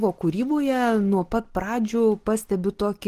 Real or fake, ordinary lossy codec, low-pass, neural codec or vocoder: real; Opus, 16 kbps; 14.4 kHz; none